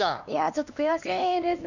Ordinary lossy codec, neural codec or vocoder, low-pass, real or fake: none; codec, 16 kHz, 2 kbps, X-Codec, HuBERT features, trained on LibriSpeech; 7.2 kHz; fake